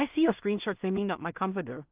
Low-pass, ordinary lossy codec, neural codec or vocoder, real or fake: 3.6 kHz; Opus, 24 kbps; codec, 16 kHz in and 24 kHz out, 0.4 kbps, LongCat-Audio-Codec, two codebook decoder; fake